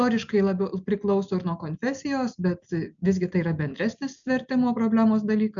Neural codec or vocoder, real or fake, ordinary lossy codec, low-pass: none; real; MP3, 96 kbps; 7.2 kHz